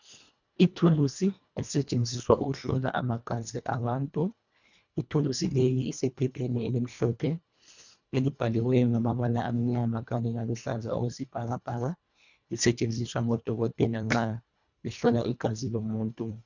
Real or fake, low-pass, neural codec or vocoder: fake; 7.2 kHz; codec, 24 kHz, 1.5 kbps, HILCodec